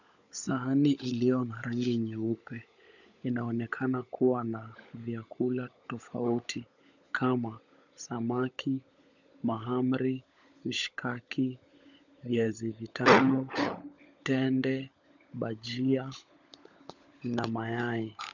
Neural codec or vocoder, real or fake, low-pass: codec, 16 kHz, 16 kbps, FunCodec, trained on LibriTTS, 50 frames a second; fake; 7.2 kHz